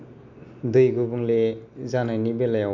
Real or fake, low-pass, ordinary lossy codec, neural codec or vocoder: real; 7.2 kHz; none; none